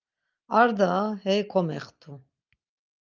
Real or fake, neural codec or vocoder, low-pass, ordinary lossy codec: real; none; 7.2 kHz; Opus, 24 kbps